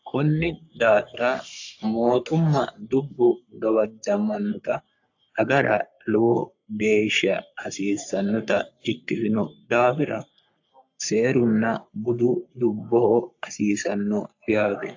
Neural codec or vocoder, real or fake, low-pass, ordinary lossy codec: codec, 44.1 kHz, 3.4 kbps, Pupu-Codec; fake; 7.2 kHz; AAC, 48 kbps